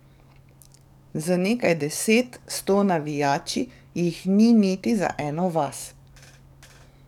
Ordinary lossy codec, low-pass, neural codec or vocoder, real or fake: none; 19.8 kHz; codec, 44.1 kHz, 7.8 kbps, DAC; fake